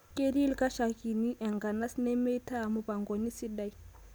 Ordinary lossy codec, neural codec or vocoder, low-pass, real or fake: none; none; none; real